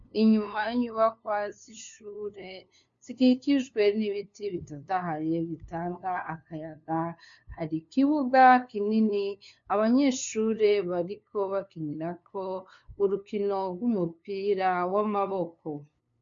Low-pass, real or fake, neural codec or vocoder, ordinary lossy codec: 7.2 kHz; fake; codec, 16 kHz, 2 kbps, FunCodec, trained on LibriTTS, 25 frames a second; MP3, 48 kbps